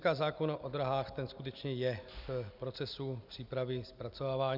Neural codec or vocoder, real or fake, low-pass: none; real; 5.4 kHz